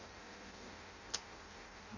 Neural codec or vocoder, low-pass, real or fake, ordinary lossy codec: codec, 16 kHz in and 24 kHz out, 0.6 kbps, FireRedTTS-2 codec; 7.2 kHz; fake; none